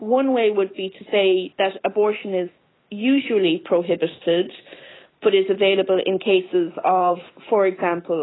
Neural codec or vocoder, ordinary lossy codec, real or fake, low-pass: none; AAC, 16 kbps; real; 7.2 kHz